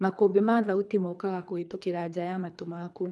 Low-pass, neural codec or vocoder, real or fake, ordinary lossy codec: none; codec, 24 kHz, 3 kbps, HILCodec; fake; none